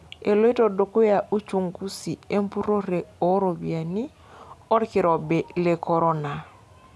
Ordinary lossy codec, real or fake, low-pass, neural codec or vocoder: none; real; none; none